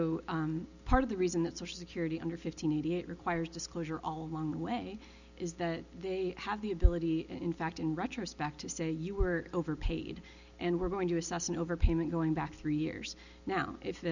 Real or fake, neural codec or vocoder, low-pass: real; none; 7.2 kHz